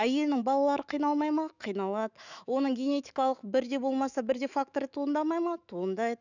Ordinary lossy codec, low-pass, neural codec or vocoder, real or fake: none; 7.2 kHz; autoencoder, 48 kHz, 128 numbers a frame, DAC-VAE, trained on Japanese speech; fake